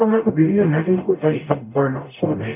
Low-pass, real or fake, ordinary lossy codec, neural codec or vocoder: 3.6 kHz; fake; none; codec, 44.1 kHz, 0.9 kbps, DAC